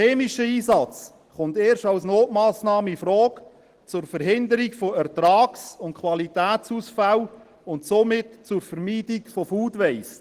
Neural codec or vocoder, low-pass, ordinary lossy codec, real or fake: none; 14.4 kHz; Opus, 16 kbps; real